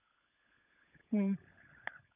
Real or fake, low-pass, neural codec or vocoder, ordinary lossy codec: fake; 3.6 kHz; codec, 16 kHz, 16 kbps, FunCodec, trained on LibriTTS, 50 frames a second; none